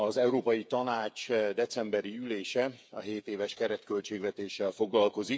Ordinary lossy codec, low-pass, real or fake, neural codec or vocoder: none; none; fake; codec, 16 kHz, 8 kbps, FreqCodec, smaller model